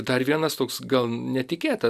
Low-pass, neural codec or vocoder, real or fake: 14.4 kHz; none; real